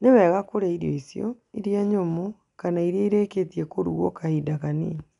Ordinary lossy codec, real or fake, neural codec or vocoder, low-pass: none; real; none; 10.8 kHz